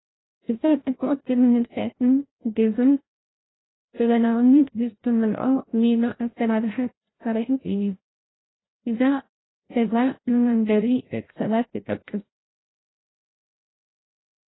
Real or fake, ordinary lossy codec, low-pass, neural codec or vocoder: fake; AAC, 16 kbps; 7.2 kHz; codec, 16 kHz, 0.5 kbps, FreqCodec, larger model